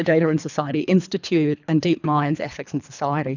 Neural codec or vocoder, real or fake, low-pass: codec, 24 kHz, 3 kbps, HILCodec; fake; 7.2 kHz